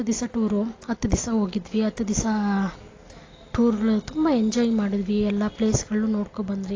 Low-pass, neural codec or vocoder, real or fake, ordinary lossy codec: 7.2 kHz; none; real; AAC, 32 kbps